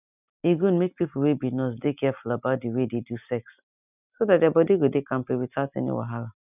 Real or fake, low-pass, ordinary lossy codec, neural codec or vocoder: real; 3.6 kHz; none; none